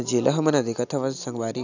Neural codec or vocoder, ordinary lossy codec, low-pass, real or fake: none; none; 7.2 kHz; real